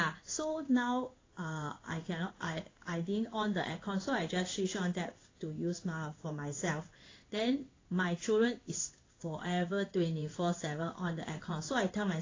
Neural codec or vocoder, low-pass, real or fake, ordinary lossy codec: codec, 16 kHz in and 24 kHz out, 1 kbps, XY-Tokenizer; 7.2 kHz; fake; AAC, 32 kbps